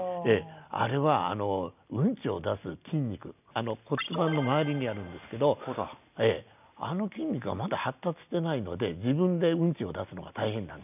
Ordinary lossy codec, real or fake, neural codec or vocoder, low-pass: none; real; none; 3.6 kHz